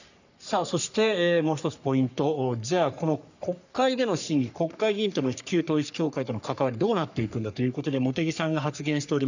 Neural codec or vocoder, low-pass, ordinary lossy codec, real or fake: codec, 44.1 kHz, 3.4 kbps, Pupu-Codec; 7.2 kHz; none; fake